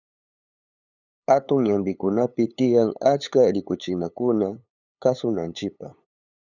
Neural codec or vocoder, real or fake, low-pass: codec, 16 kHz, 8 kbps, FunCodec, trained on LibriTTS, 25 frames a second; fake; 7.2 kHz